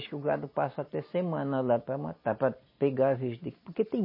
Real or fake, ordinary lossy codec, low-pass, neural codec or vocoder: real; MP3, 24 kbps; 5.4 kHz; none